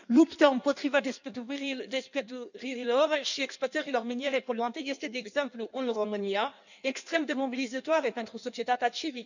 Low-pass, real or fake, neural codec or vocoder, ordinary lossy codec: 7.2 kHz; fake; codec, 16 kHz in and 24 kHz out, 1.1 kbps, FireRedTTS-2 codec; none